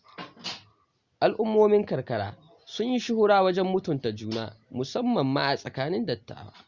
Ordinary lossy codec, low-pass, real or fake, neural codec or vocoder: Opus, 64 kbps; 7.2 kHz; real; none